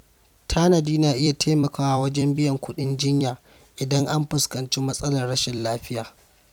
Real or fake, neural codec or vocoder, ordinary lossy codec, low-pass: fake; vocoder, 44.1 kHz, 128 mel bands every 256 samples, BigVGAN v2; none; 19.8 kHz